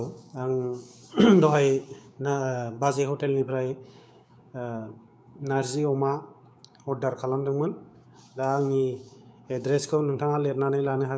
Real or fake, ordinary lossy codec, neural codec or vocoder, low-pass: fake; none; codec, 16 kHz, 6 kbps, DAC; none